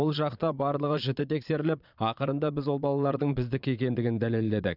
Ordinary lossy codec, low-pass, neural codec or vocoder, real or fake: none; 5.4 kHz; vocoder, 22.05 kHz, 80 mel bands, Vocos; fake